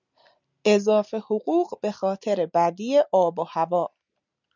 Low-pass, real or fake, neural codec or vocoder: 7.2 kHz; real; none